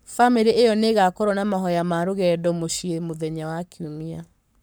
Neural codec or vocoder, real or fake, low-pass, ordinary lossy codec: codec, 44.1 kHz, 7.8 kbps, Pupu-Codec; fake; none; none